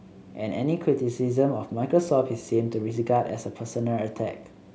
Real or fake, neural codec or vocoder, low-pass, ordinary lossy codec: real; none; none; none